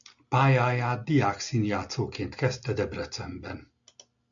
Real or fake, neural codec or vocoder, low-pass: real; none; 7.2 kHz